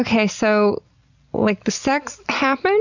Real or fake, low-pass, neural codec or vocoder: real; 7.2 kHz; none